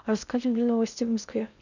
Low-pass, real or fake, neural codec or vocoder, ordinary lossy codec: 7.2 kHz; fake; codec, 16 kHz in and 24 kHz out, 0.6 kbps, FocalCodec, streaming, 2048 codes; none